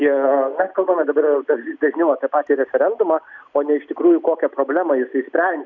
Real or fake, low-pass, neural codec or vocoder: real; 7.2 kHz; none